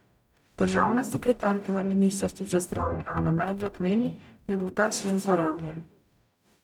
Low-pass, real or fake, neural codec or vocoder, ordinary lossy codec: 19.8 kHz; fake; codec, 44.1 kHz, 0.9 kbps, DAC; none